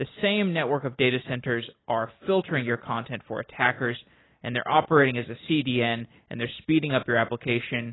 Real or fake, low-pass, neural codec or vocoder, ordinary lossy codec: real; 7.2 kHz; none; AAC, 16 kbps